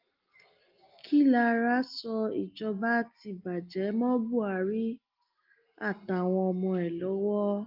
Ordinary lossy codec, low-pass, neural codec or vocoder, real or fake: Opus, 24 kbps; 5.4 kHz; none; real